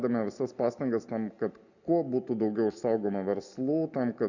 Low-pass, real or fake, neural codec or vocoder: 7.2 kHz; real; none